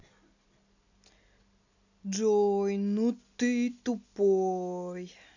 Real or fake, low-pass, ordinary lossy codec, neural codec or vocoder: real; 7.2 kHz; none; none